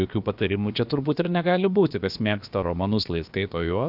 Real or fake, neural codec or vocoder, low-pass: fake; codec, 16 kHz, about 1 kbps, DyCAST, with the encoder's durations; 5.4 kHz